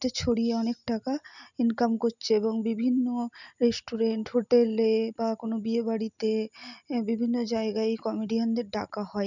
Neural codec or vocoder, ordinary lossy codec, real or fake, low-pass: none; none; real; 7.2 kHz